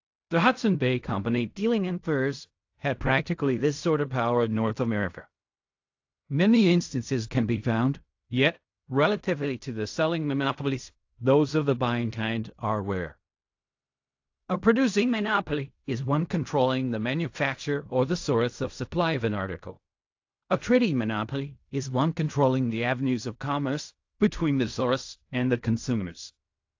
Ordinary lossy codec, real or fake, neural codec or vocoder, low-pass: AAC, 48 kbps; fake; codec, 16 kHz in and 24 kHz out, 0.4 kbps, LongCat-Audio-Codec, fine tuned four codebook decoder; 7.2 kHz